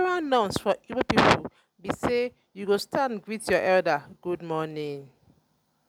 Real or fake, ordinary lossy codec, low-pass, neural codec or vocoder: real; none; 19.8 kHz; none